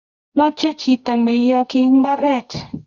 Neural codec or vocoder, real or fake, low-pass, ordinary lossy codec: codec, 24 kHz, 0.9 kbps, WavTokenizer, medium music audio release; fake; 7.2 kHz; Opus, 64 kbps